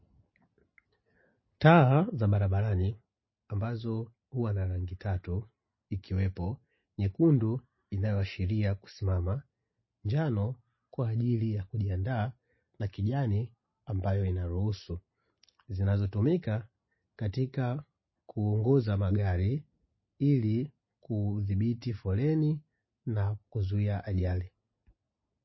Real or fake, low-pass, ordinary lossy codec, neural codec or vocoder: real; 7.2 kHz; MP3, 24 kbps; none